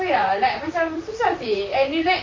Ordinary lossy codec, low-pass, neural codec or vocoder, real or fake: MP3, 32 kbps; 7.2 kHz; vocoder, 44.1 kHz, 128 mel bands, Pupu-Vocoder; fake